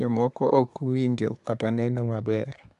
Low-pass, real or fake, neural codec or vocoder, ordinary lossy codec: 10.8 kHz; fake; codec, 24 kHz, 1 kbps, SNAC; AAC, 96 kbps